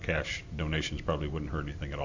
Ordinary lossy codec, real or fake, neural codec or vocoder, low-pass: AAC, 48 kbps; real; none; 7.2 kHz